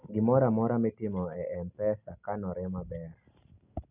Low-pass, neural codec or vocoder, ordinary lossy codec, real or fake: 3.6 kHz; none; none; real